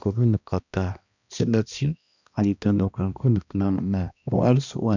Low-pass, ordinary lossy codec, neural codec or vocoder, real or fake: 7.2 kHz; none; codec, 16 kHz, 1 kbps, X-Codec, HuBERT features, trained on balanced general audio; fake